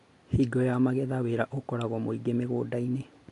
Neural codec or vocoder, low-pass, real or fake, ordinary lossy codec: none; 10.8 kHz; real; AAC, 48 kbps